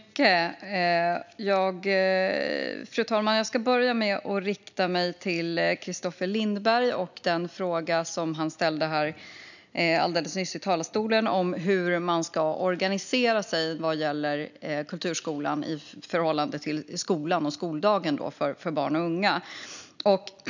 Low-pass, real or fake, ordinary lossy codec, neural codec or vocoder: 7.2 kHz; real; none; none